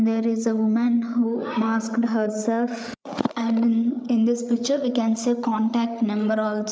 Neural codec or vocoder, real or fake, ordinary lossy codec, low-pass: codec, 16 kHz, 8 kbps, FreqCodec, larger model; fake; none; none